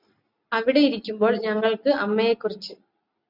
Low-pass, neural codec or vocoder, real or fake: 5.4 kHz; none; real